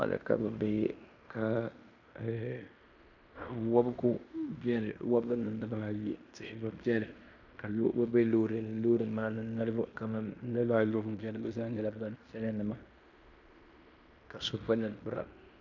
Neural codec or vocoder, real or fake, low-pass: codec, 16 kHz in and 24 kHz out, 0.9 kbps, LongCat-Audio-Codec, fine tuned four codebook decoder; fake; 7.2 kHz